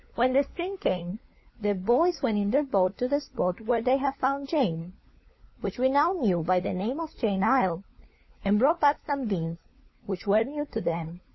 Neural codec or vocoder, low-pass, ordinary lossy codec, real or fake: codec, 16 kHz, 4 kbps, FunCodec, trained on LibriTTS, 50 frames a second; 7.2 kHz; MP3, 24 kbps; fake